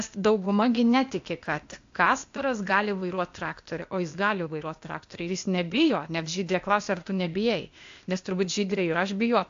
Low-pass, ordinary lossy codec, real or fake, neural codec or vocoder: 7.2 kHz; AAC, 64 kbps; fake; codec, 16 kHz, 0.8 kbps, ZipCodec